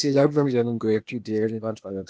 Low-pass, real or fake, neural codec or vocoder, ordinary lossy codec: none; fake; codec, 16 kHz, 0.8 kbps, ZipCodec; none